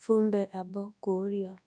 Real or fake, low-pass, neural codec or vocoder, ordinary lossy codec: fake; 9.9 kHz; codec, 24 kHz, 0.9 kbps, WavTokenizer, large speech release; none